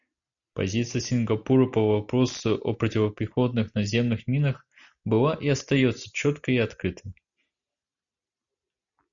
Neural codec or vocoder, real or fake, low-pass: none; real; 7.2 kHz